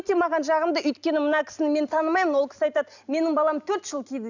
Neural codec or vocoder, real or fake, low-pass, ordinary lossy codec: none; real; 7.2 kHz; none